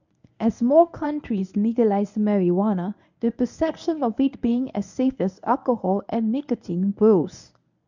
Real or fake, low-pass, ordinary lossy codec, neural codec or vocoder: fake; 7.2 kHz; none; codec, 24 kHz, 0.9 kbps, WavTokenizer, medium speech release version 1